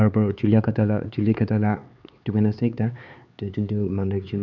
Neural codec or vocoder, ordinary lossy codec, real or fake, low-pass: codec, 16 kHz, 4 kbps, X-Codec, HuBERT features, trained on LibriSpeech; none; fake; 7.2 kHz